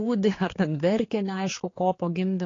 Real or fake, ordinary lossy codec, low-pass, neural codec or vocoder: fake; AAC, 32 kbps; 7.2 kHz; codec, 16 kHz, 4 kbps, X-Codec, HuBERT features, trained on general audio